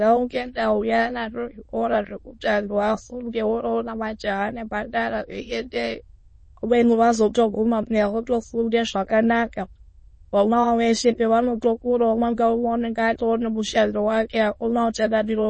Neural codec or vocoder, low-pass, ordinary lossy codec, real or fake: autoencoder, 22.05 kHz, a latent of 192 numbers a frame, VITS, trained on many speakers; 9.9 kHz; MP3, 32 kbps; fake